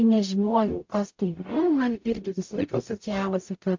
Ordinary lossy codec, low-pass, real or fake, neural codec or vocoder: MP3, 48 kbps; 7.2 kHz; fake; codec, 44.1 kHz, 0.9 kbps, DAC